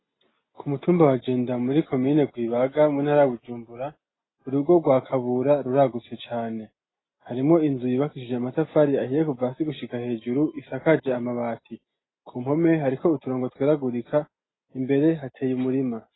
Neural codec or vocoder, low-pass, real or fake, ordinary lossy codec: none; 7.2 kHz; real; AAC, 16 kbps